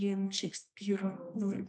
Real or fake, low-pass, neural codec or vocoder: fake; 9.9 kHz; codec, 24 kHz, 0.9 kbps, WavTokenizer, medium music audio release